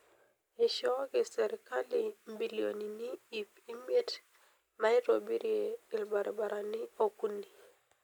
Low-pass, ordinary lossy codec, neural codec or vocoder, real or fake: none; none; none; real